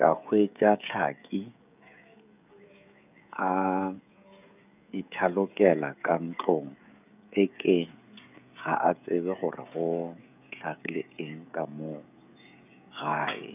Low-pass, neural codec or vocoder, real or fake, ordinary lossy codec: 3.6 kHz; codec, 16 kHz, 16 kbps, FreqCodec, smaller model; fake; none